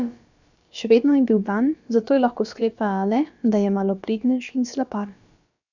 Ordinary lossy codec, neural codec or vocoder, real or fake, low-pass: none; codec, 16 kHz, about 1 kbps, DyCAST, with the encoder's durations; fake; 7.2 kHz